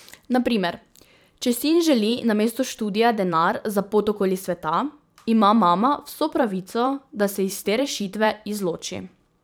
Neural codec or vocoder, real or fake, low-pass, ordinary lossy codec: vocoder, 44.1 kHz, 128 mel bands every 512 samples, BigVGAN v2; fake; none; none